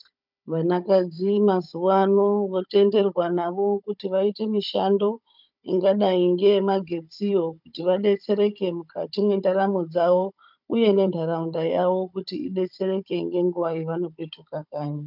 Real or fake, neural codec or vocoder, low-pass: fake; codec, 16 kHz, 16 kbps, FunCodec, trained on Chinese and English, 50 frames a second; 5.4 kHz